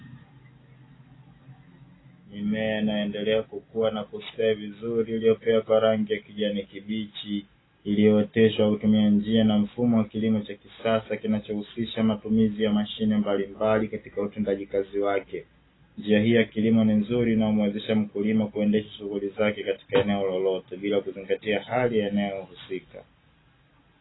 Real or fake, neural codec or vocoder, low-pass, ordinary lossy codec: real; none; 7.2 kHz; AAC, 16 kbps